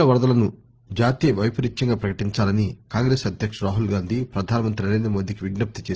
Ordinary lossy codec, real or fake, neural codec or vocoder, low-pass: Opus, 16 kbps; real; none; 7.2 kHz